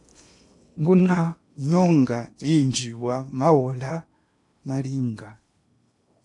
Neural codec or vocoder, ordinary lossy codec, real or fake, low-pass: codec, 16 kHz in and 24 kHz out, 0.8 kbps, FocalCodec, streaming, 65536 codes; AAC, 64 kbps; fake; 10.8 kHz